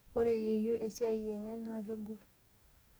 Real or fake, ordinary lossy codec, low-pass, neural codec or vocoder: fake; none; none; codec, 44.1 kHz, 2.6 kbps, DAC